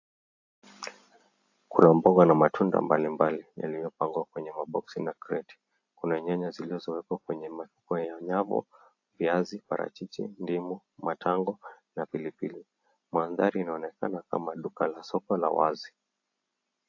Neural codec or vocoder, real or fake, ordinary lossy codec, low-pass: none; real; AAC, 48 kbps; 7.2 kHz